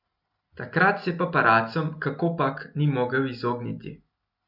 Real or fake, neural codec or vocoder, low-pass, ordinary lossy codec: real; none; 5.4 kHz; none